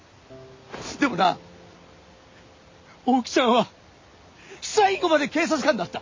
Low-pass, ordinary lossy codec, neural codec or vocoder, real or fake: 7.2 kHz; MP3, 32 kbps; none; real